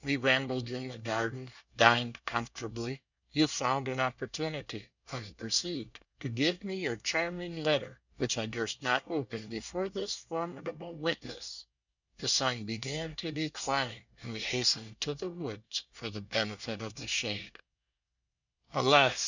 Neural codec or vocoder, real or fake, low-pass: codec, 24 kHz, 1 kbps, SNAC; fake; 7.2 kHz